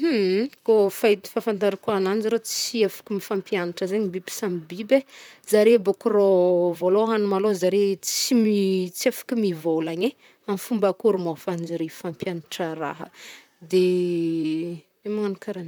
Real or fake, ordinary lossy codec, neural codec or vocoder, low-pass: fake; none; vocoder, 44.1 kHz, 128 mel bands, Pupu-Vocoder; none